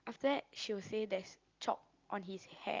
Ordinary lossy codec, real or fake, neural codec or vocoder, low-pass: Opus, 24 kbps; real; none; 7.2 kHz